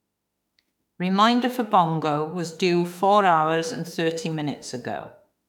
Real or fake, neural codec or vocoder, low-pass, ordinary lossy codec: fake; autoencoder, 48 kHz, 32 numbers a frame, DAC-VAE, trained on Japanese speech; 19.8 kHz; none